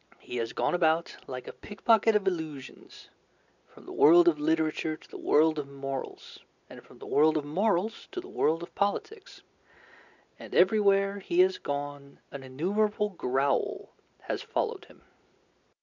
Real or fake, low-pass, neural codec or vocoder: real; 7.2 kHz; none